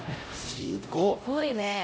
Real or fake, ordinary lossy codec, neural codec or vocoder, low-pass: fake; none; codec, 16 kHz, 0.5 kbps, X-Codec, HuBERT features, trained on LibriSpeech; none